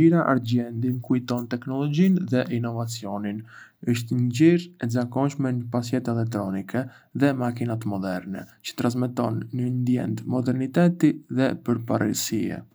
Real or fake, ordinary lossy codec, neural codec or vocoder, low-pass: real; none; none; none